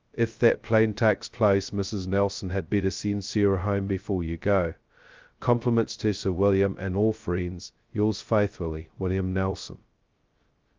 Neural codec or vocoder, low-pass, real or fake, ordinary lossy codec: codec, 16 kHz, 0.2 kbps, FocalCodec; 7.2 kHz; fake; Opus, 24 kbps